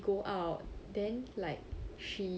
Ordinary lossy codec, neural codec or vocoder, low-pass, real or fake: none; none; none; real